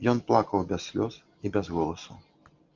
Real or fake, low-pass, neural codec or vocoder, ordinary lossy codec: real; 7.2 kHz; none; Opus, 32 kbps